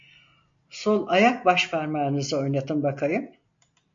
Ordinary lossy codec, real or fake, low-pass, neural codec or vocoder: MP3, 48 kbps; real; 7.2 kHz; none